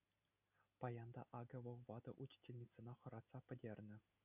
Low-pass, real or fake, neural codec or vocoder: 3.6 kHz; real; none